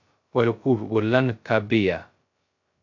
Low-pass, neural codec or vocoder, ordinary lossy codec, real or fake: 7.2 kHz; codec, 16 kHz, 0.2 kbps, FocalCodec; MP3, 48 kbps; fake